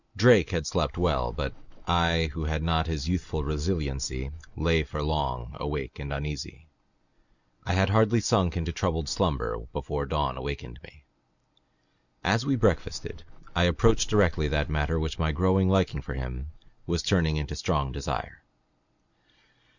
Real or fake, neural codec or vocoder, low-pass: real; none; 7.2 kHz